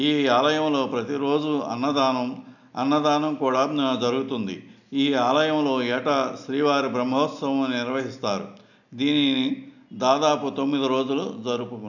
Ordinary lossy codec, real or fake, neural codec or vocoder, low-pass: none; real; none; 7.2 kHz